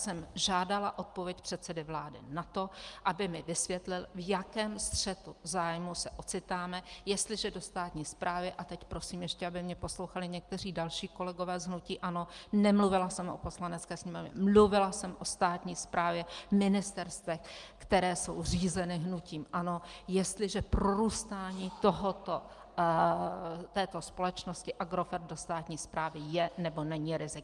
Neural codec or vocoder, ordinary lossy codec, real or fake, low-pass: none; Opus, 32 kbps; real; 10.8 kHz